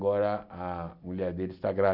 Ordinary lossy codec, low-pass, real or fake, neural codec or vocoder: none; 5.4 kHz; real; none